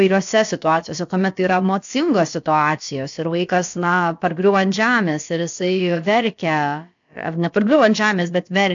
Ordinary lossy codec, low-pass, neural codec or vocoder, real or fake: AAC, 64 kbps; 7.2 kHz; codec, 16 kHz, about 1 kbps, DyCAST, with the encoder's durations; fake